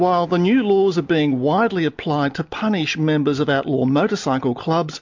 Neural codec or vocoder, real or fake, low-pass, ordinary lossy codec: none; real; 7.2 kHz; MP3, 64 kbps